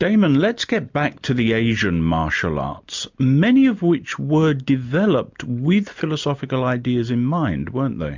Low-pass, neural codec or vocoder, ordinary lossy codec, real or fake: 7.2 kHz; none; MP3, 64 kbps; real